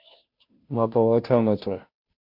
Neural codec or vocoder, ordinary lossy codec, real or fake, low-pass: codec, 16 kHz, 0.5 kbps, FunCodec, trained on Chinese and English, 25 frames a second; MP3, 48 kbps; fake; 5.4 kHz